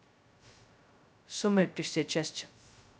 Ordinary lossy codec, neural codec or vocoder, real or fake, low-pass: none; codec, 16 kHz, 0.2 kbps, FocalCodec; fake; none